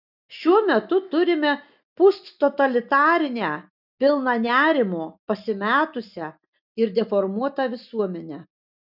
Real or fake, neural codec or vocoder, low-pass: real; none; 5.4 kHz